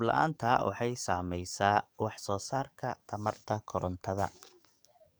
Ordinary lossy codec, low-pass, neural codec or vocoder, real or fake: none; none; codec, 44.1 kHz, 7.8 kbps, DAC; fake